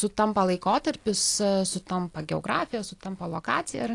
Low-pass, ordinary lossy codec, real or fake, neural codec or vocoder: 10.8 kHz; AAC, 48 kbps; real; none